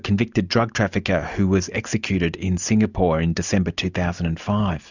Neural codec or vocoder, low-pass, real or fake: none; 7.2 kHz; real